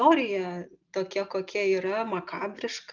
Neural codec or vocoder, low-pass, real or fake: none; 7.2 kHz; real